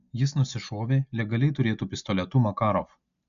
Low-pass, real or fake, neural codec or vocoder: 7.2 kHz; real; none